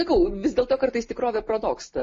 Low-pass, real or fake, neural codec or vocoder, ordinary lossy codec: 10.8 kHz; real; none; MP3, 32 kbps